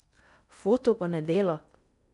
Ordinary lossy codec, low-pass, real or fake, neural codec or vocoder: none; 10.8 kHz; fake; codec, 16 kHz in and 24 kHz out, 0.6 kbps, FocalCodec, streaming, 4096 codes